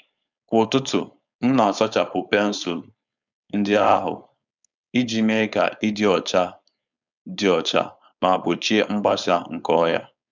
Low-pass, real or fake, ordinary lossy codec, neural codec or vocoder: 7.2 kHz; fake; none; codec, 16 kHz, 4.8 kbps, FACodec